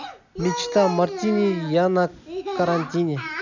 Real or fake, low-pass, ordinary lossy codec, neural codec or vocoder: real; 7.2 kHz; none; none